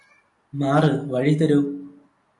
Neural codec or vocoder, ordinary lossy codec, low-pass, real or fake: none; AAC, 64 kbps; 10.8 kHz; real